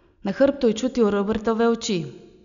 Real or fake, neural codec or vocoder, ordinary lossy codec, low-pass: real; none; none; 7.2 kHz